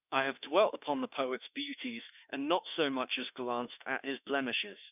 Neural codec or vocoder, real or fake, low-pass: autoencoder, 48 kHz, 32 numbers a frame, DAC-VAE, trained on Japanese speech; fake; 3.6 kHz